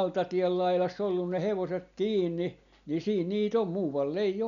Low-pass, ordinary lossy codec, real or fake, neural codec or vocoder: 7.2 kHz; none; real; none